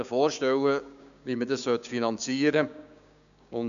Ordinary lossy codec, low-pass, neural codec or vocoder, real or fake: none; 7.2 kHz; codec, 16 kHz, 6 kbps, DAC; fake